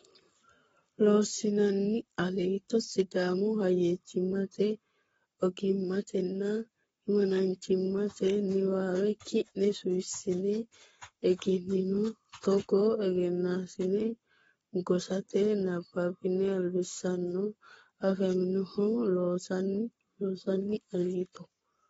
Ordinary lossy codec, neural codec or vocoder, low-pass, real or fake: AAC, 24 kbps; codec, 44.1 kHz, 7.8 kbps, Pupu-Codec; 19.8 kHz; fake